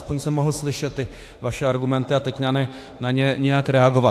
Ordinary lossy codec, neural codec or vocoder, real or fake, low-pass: AAC, 64 kbps; autoencoder, 48 kHz, 32 numbers a frame, DAC-VAE, trained on Japanese speech; fake; 14.4 kHz